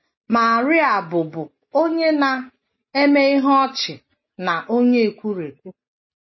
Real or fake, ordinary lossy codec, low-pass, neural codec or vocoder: real; MP3, 24 kbps; 7.2 kHz; none